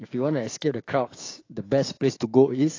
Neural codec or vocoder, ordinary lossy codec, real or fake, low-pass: codec, 16 kHz, 6 kbps, DAC; AAC, 32 kbps; fake; 7.2 kHz